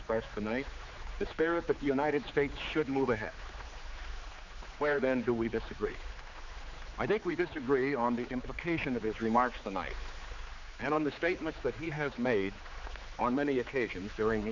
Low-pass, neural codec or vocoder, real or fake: 7.2 kHz; codec, 16 kHz, 4 kbps, X-Codec, HuBERT features, trained on general audio; fake